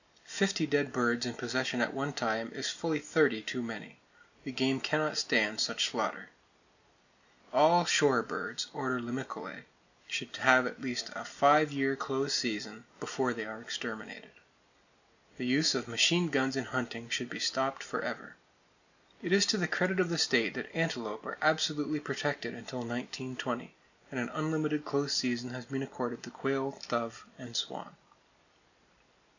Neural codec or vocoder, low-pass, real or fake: none; 7.2 kHz; real